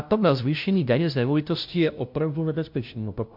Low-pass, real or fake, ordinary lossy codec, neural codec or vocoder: 5.4 kHz; fake; MP3, 48 kbps; codec, 16 kHz, 0.5 kbps, FunCodec, trained on LibriTTS, 25 frames a second